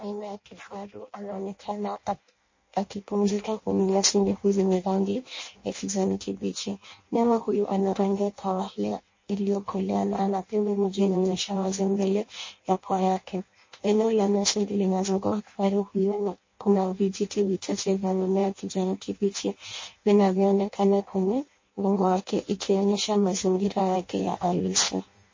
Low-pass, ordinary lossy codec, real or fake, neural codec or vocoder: 7.2 kHz; MP3, 32 kbps; fake; codec, 16 kHz in and 24 kHz out, 0.6 kbps, FireRedTTS-2 codec